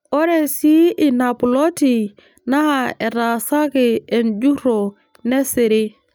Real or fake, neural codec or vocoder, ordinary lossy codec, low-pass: real; none; none; none